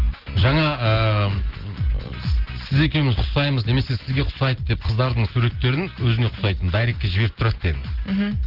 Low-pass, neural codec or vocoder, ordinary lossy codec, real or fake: 5.4 kHz; none; Opus, 16 kbps; real